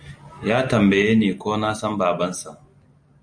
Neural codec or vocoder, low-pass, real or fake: none; 9.9 kHz; real